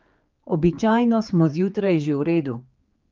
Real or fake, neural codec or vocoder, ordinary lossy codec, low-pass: fake; codec, 16 kHz, 4 kbps, X-Codec, HuBERT features, trained on general audio; Opus, 32 kbps; 7.2 kHz